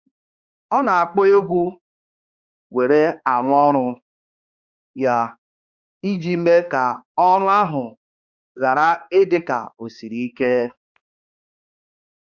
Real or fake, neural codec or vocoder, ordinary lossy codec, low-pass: fake; codec, 16 kHz, 2 kbps, X-Codec, HuBERT features, trained on balanced general audio; Opus, 64 kbps; 7.2 kHz